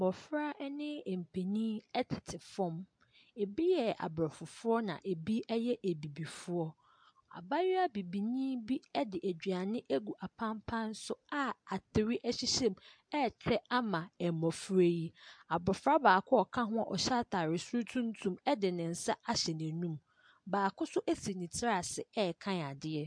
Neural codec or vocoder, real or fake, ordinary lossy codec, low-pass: none; real; MP3, 64 kbps; 9.9 kHz